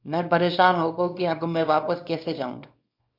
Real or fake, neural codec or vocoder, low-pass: fake; codec, 24 kHz, 0.9 kbps, WavTokenizer, small release; 5.4 kHz